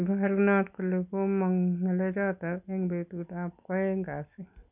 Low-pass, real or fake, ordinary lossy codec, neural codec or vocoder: 3.6 kHz; real; none; none